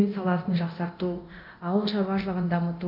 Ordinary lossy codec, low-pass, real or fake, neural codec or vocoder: none; 5.4 kHz; fake; codec, 24 kHz, 0.9 kbps, DualCodec